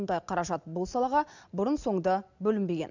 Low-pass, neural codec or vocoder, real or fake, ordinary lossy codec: 7.2 kHz; none; real; none